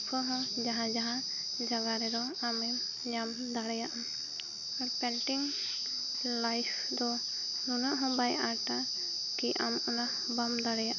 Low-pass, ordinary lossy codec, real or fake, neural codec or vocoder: 7.2 kHz; none; real; none